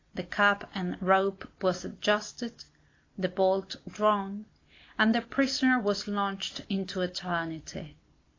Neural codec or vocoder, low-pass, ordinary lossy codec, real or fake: none; 7.2 kHz; AAC, 32 kbps; real